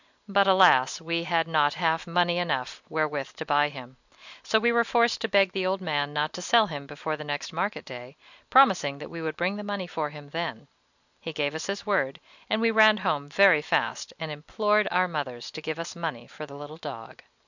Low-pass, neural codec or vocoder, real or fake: 7.2 kHz; none; real